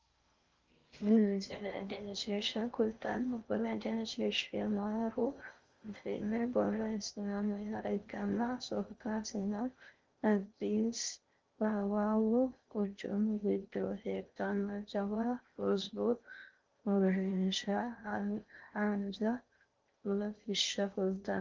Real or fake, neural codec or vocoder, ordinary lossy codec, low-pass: fake; codec, 16 kHz in and 24 kHz out, 0.6 kbps, FocalCodec, streaming, 4096 codes; Opus, 32 kbps; 7.2 kHz